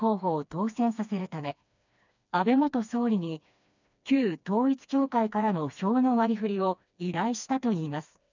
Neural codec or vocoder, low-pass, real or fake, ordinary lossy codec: codec, 16 kHz, 2 kbps, FreqCodec, smaller model; 7.2 kHz; fake; none